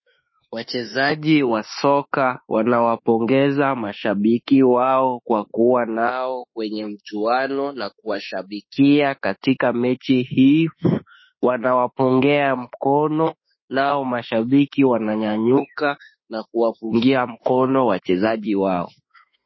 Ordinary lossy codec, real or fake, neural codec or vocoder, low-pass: MP3, 24 kbps; fake; autoencoder, 48 kHz, 32 numbers a frame, DAC-VAE, trained on Japanese speech; 7.2 kHz